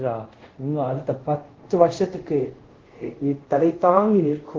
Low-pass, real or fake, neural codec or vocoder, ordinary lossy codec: 7.2 kHz; fake; codec, 24 kHz, 0.5 kbps, DualCodec; Opus, 16 kbps